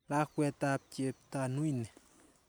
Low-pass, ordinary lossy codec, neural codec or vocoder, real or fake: none; none; none; real